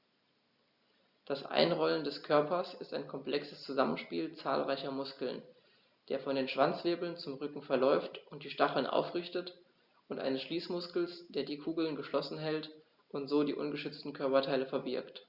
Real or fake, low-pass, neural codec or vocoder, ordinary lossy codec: real; 5.4 kHz; none; Opus, 64 kbps